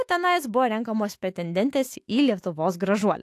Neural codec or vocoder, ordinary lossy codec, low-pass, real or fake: autoencoder, 48 kHz, 128 numbers a frame, DAC-VAE, trained on Japanese speech; AAC, 64 kbps; 14.4 kHz; fake